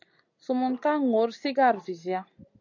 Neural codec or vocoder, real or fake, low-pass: none; real; 7.2 kHz